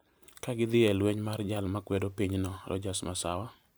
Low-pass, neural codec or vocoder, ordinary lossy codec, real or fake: none; vocoder, 44.1 kHz, 128 mel bands every 512 samples, BigVGAN v2; none; fake